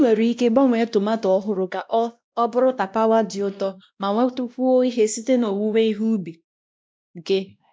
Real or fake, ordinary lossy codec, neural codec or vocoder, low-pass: fake; none; codec, 16 kHz, 1 kbps, X-Codec, WavLM features, trained on Multilingual LibriSpeech; none